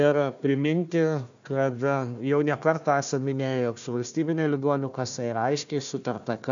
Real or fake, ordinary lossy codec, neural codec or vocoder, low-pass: fake; MP3, 96 kbps; codec, 16 kHz, 1 kbps, FunCodec, trained on Chinese and English, 50 frames a second; 7.2 kHz